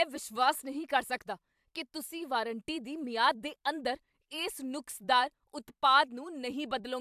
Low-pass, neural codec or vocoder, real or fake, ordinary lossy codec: 14.4 kHz; vocoder, 44.1 kHz, 128 mel bands every 256 samples, BigVGAN v2; fake; AAC, 96 kbps